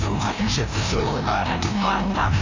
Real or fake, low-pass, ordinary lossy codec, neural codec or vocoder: fake; 7.2 kHz; AAC, 48 kbps; codec, 16 kHz, 1 kbps, FreqCodec, larger model